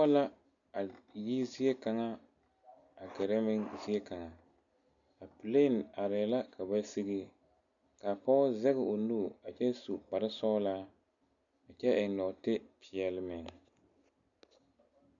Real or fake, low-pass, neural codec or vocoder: real; 7.2 kHz; none